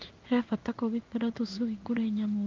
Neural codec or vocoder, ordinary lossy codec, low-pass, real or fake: codec, 16 kHz in and 24 kHz out, 1 kbps, XY-Tokenizer; Opus, 24 kbps; 7.2 kHz; fake